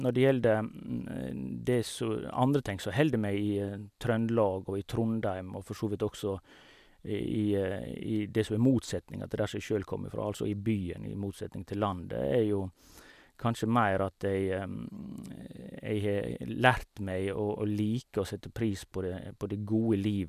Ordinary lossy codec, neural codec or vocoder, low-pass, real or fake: none; none; 14.4 kHz; real